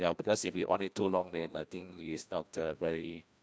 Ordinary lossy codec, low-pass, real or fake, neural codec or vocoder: none; none; fake; codec, 16 kHz, 1 kbps, FreqCodec, larger model